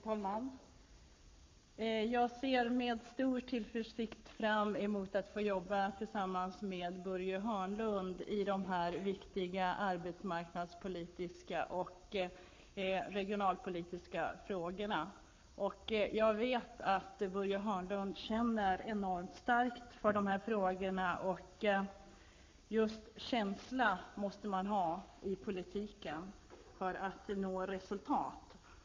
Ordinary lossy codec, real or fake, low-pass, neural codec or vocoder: MP3, 48 kbps; fake; 7.2 kHz; codec, 44.1 kHz, 7.8 kbps, Pupu-Codec